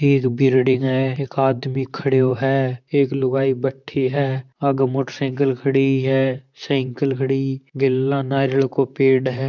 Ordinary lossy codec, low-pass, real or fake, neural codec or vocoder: none; 7.2 kHz; fake; vocoder, 44.1 kHz, 128 mel bands, Pupu-Vocoder